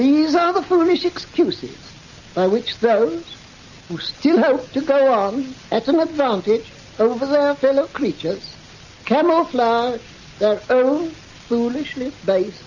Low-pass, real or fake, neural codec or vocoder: 7.2 kHz; real; none